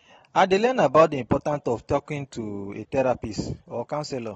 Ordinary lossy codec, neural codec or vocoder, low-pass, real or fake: AAC, 24 kbps; none; 19.8 kHz; real